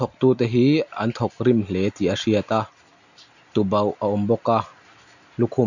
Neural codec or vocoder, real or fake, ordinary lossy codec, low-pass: none; real; none; 7.2 kHz